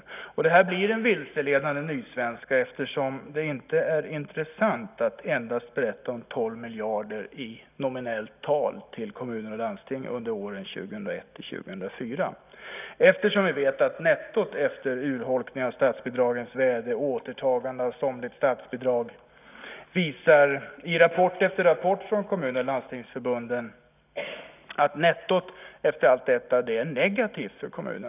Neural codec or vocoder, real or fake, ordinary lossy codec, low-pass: none; real; none; 3.6 kHz